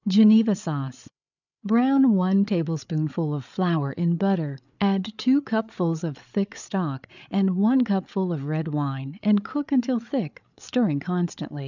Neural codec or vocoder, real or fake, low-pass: codec, 16 kHz, 8 kbps, FreqCodec, larger model; fake; 7.2 kHz